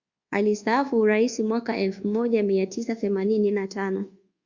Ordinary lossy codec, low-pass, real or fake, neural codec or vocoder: Opus, 64 kbps; 7.2 kHz; fake; codec, 24 kHz, 1.2 kbps, DualCodec